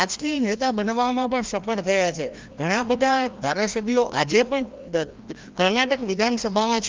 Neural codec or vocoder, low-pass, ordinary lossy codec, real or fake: codec, 16 kHz, 1 kbps, FreqCodec, larger model; 7.2 kHz; Opus, 32 kbps; fake